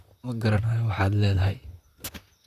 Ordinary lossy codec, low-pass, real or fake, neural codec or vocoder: none; 14.4 kHz; fake; vocoder, 44.1 kHz, 128 mel bands, Pupu-Vocoder